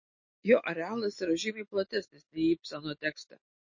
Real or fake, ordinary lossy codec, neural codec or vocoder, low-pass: real; MP3, 32 kbps; none; 7.2 kHz